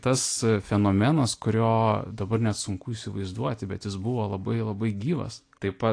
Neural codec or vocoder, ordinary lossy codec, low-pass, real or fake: none; AAC, 48 kbps; 9.9 kHz; real